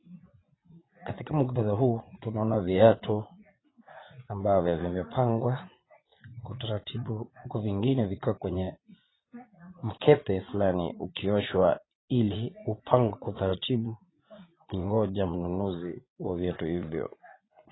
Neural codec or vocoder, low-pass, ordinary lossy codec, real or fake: vocoder, 44.1 kHz, 80 mel bands, Vocos; 7.2 kHz; AAC, 16 kbps; fake